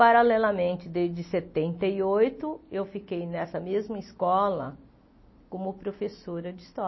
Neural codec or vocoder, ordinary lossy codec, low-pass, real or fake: none; MP3, 24 kbps; 7.2 kHz; real